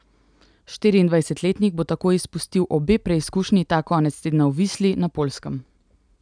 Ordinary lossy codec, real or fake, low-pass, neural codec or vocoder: none; real; 9.9 kHz; none